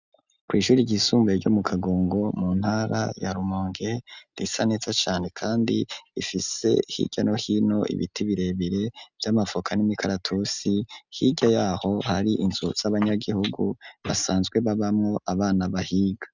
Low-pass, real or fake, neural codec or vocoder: 7.2 kHz; real; none